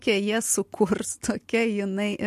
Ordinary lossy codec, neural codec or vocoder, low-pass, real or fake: MP3, 64 kbps; none; 14.4 kHz; real